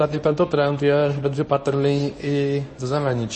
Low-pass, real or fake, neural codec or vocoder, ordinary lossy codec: 10.8 kHz; fake; codec, 24 kHz, 0.9 kbps, WavTokenizer, medium speech release version 1; MP3, 32 kbps